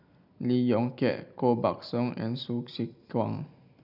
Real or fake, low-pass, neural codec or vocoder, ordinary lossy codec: real; 5.4 kHz; none; none